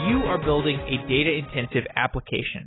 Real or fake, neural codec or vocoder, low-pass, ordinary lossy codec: real; none; 7.2 kHz; AAC, 16 kbps